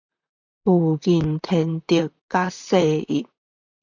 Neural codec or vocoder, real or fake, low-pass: vocoder, 44.1 kHz, 128 mel bands, Pupu-Vocoder; fake; 7.2 kHz